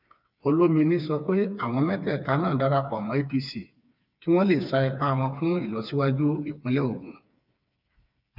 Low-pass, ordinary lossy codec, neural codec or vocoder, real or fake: 5.4 kHz; none; codec, 16 kHz, 4 kbps, FreqCodec, smaller model; fake